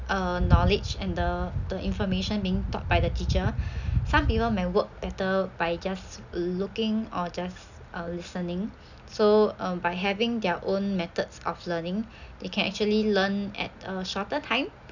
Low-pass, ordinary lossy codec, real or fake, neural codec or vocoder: 7.2 kHz; none; real; none